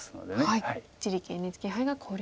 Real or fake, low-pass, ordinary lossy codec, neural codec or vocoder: real; none; none; none